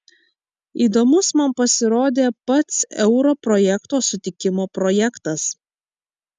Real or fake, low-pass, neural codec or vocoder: real; 10.8 kHz; none